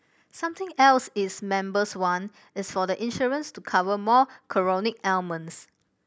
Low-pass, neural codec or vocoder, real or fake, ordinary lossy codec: none; none; real; none